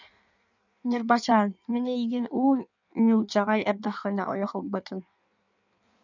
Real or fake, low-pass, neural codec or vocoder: fake; 7.2 kHz; codec, 16 kHz in and 24 kHz out, 1.1 kbps, FireRedTTS-2 codec